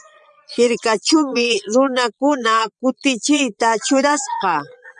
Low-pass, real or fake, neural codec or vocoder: 9.9 kHz; fake; vocoder, 22.05 kHz, 80 mel bands, Vocos